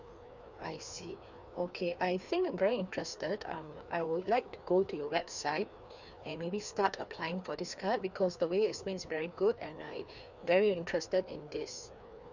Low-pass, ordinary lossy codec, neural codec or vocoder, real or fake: 7.2 kHz; none; codec, 16 kHz, 2 kbps, FreqCodec, larger model; fake